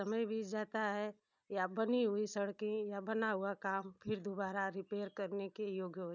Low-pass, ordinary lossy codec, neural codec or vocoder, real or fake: 7.2 kHz; none; none; real